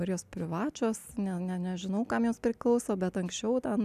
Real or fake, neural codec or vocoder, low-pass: real; none; 14.4 kHz